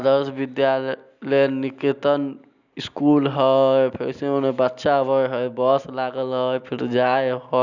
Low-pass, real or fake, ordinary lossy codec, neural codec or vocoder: 7.2 kHz; real; none; none